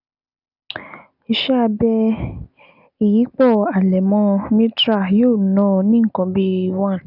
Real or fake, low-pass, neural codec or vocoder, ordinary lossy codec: real; 5.4 kHz; none; none